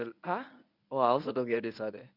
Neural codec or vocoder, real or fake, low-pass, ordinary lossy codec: codec, 24 kHz, 0.9 kbps, WavTokenizer, medium speech release version 1; fake; 5.4 kHz; none